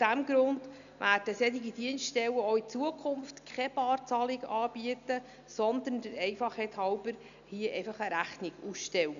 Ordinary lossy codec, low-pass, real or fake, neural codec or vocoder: none; 7.2 kHz; real; none